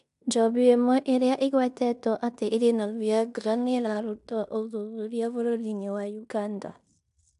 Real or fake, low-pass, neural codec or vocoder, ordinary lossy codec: fake; 10.8 kHz; codec, 16 kHz in and 24 kHz out, 0.9 kbps, LongCat-Audio-Codec, fine tuned four codebook decoder; AAC, 96 kbps